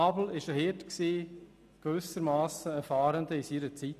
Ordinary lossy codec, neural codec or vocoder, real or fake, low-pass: none; none; real; 14.4 kHz